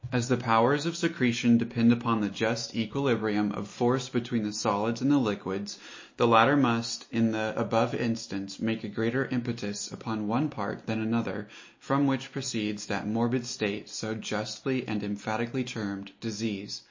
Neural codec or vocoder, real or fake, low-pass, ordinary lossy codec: none; real; 7.2 kHz; MP3, 32 kbps